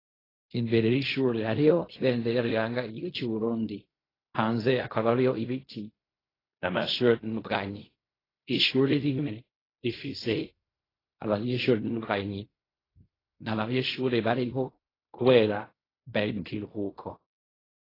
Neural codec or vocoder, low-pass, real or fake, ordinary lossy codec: codec, 16 kHz in and 24 kHz out, 0.4 kbps, LongCat-Audio-Codec, fine tuned four codebook decoder; 5.4 kHz; fake; AAC, 24 kbps